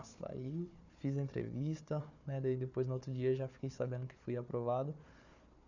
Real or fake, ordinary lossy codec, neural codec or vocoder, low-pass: fake; none; codec, 16 kHz, 4 kbps, FunCodec, trained on Chinese and English, 50 frames a second; 7.2 kHz